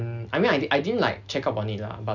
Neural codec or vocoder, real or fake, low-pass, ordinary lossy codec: none; real; 7.2 kHz; none